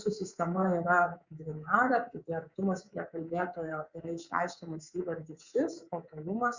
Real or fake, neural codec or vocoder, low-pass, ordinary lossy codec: fake; codec, 24 kHz, 3.1 kbps, DualCodec; 7.2 kHz; Opus, 64 kbps